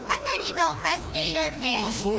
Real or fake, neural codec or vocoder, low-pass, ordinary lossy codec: fake; codec, 16 kHz, 1 kbps, FreqCodec, larger model; none; none